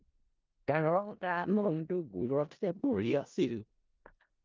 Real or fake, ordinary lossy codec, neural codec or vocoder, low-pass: fake; Opus, 24 kbps; codec, 16 kHz in and 24 kHz out, 0.4 kbps, LongCat-Audio-Codec, four codebook decoder; 7.2 kHz